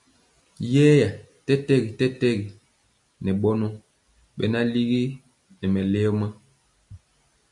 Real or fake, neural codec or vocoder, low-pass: real; none; 10.8 kHz